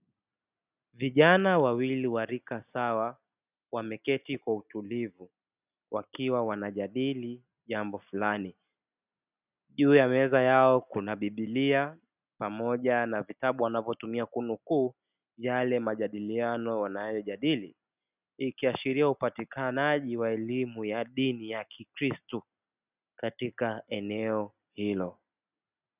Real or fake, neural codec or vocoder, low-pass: real; none; 3.6 kHz